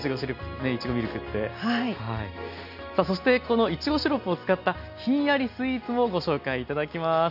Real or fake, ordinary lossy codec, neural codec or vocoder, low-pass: real; none; none; 5.4 kHz